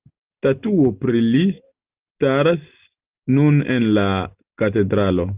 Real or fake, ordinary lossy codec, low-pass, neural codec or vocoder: real; Opus, 24 kbps; 3.6 kHz; none